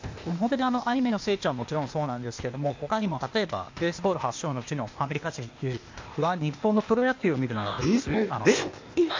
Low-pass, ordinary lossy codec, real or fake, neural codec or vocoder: 7.2 kHz; MP3, 48 kbps; fake; codec, 16 kHz, 0.8 kbps, ZipCodec